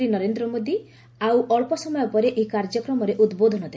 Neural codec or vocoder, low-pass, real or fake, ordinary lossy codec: none; none; real; none